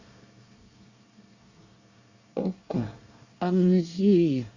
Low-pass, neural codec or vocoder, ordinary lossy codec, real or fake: 7.2 kHz; codec, 24 kHz, 1 kbps, SNAC; Opus, 64 kbps; fake